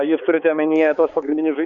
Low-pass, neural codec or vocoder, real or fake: 7.2 kHz; codec, 16 kHz, 4 kbps, X-Codec, HuBERT features, trained on balanced general audio; fake